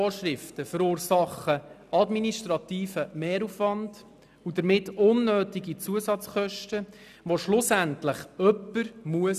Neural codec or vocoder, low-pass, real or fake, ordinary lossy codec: none; 14.4 kHz; real; none